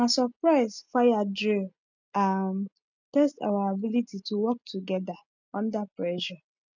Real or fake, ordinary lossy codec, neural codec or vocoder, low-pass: real; none; none; 7.2 kHz